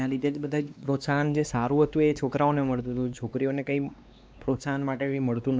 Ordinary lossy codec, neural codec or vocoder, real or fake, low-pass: none; codec, 16 kHz, 2 kbps, X-Codec, WavLM features, trained on Multilingual LibriSpeech; fake; none